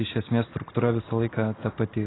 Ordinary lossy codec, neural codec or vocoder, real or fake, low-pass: AAC, 16 kbps; none; real; 7.2 kHz